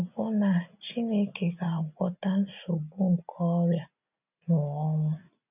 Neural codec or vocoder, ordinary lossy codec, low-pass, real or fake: none; none; 3.6 kHz; real